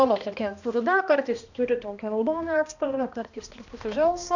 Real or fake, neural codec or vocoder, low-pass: fake; codec, 16 kHz, 1 kbps, X-Codec, HuBERT features, trained on balanced general audio; 7.2 kHz